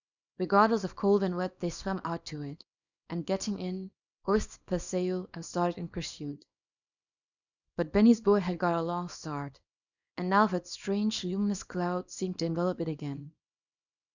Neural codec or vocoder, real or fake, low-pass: codec, 24 kHz, 0.9 kbps, WavTokenizer, small release; fake; 7.2 kHz